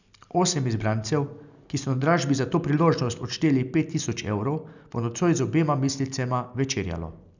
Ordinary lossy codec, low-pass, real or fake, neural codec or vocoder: none; 7.2 kHz; real; none